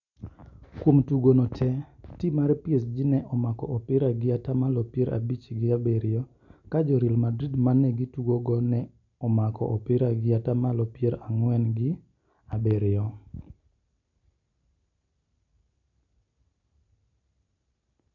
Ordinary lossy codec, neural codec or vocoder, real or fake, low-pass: none; none; real; 7.2 kHz